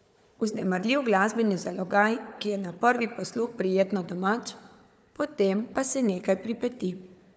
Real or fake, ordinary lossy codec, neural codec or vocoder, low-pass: fake; none; codec, 16 kHz, 4 kbps, FunCodec, trained on Chinese and English, 50 frames a second; none